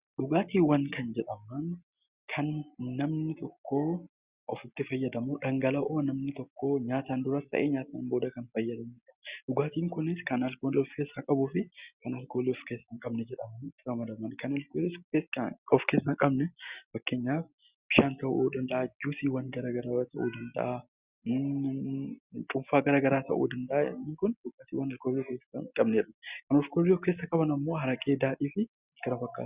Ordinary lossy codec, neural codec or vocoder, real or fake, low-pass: Opus, 64 kbps; none; real; 3.6 kHz